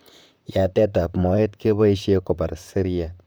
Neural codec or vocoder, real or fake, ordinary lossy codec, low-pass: vocoder, 44.1 kHz, 128 mel bands, Pupu-Vocoder; fake; none; none